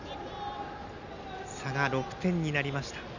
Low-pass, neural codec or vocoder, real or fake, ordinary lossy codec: 7.2 kHz; none; real; none